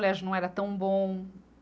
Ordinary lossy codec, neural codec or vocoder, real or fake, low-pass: none; none; real; none